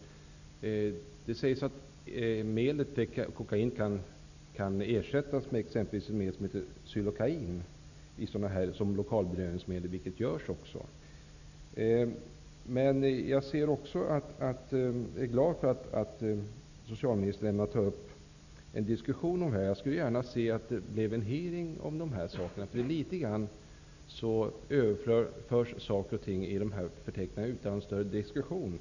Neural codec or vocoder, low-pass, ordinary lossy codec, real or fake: none; 7.2 kHz; none; real